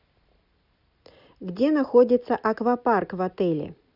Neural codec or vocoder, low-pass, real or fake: none; 5.4 kHz; real